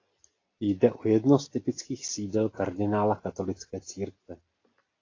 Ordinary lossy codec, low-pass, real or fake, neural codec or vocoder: AAC, 32 kbps; 7.2 kHz; real; none